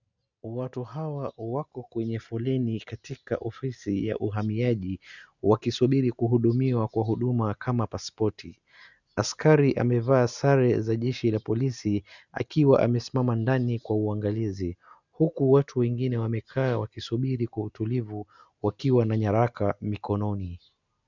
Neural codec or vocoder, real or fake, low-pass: none; real; 7.2 kHz